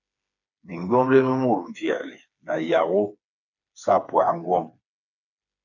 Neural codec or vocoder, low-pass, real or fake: codec, 16 kHz, 4 kbps, FreqCodec, smaller model; 7.2 kHz; fake